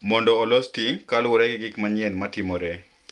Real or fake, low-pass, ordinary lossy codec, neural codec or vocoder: real; 10.8 kHz; Opus, 32 kbps; none